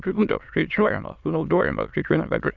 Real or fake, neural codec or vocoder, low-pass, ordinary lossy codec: fake; autoencoder, 22.05 kHz, a latent of 192 numbers a frame, VITS, trained on many speakers; 7.2 kHz; Opus, 64 kbps